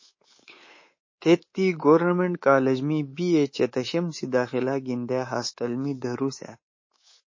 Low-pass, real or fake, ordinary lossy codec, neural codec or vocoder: 7.2 kHz; fake; MP3, 32 kbps; codec, 24 kHz, 3.1 kbps, DualCodec